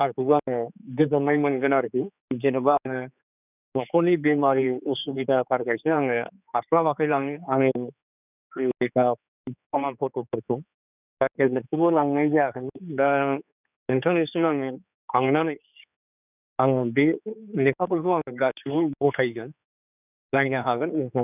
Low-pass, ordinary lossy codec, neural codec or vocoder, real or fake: 3.6 kHz; none; codec, 16 kHz, 4 kbps, X-Codec, HuBERT features, trained on general audio; fake